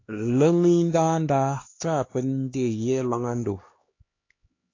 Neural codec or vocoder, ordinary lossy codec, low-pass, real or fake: codec, 16 kHz, 2 kbps, X-Codec, HuBERT features, trained on LibriSpeech; AAC, 32 kbps; 7.2 kHz; fake